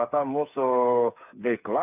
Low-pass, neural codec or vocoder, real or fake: 3.6 kHz; codec, 16 kHz, 8 kbps, FreqCodec, smaller model; fake